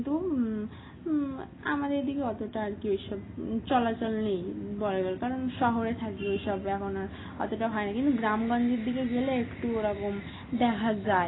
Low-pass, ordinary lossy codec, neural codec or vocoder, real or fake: 7.2 kHz; AAC, 16 kbps; none; real